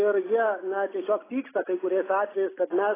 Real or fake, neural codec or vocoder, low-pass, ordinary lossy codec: real; none; 3.6 kHz; AAC, 16 kbps